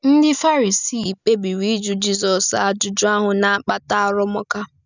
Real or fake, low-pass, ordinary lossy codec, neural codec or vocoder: real; 7.2 kHz; none; none